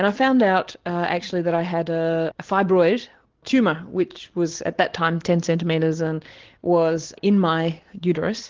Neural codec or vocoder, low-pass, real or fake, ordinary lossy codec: none; 7.2 kHz; real; Opus, 16 kbps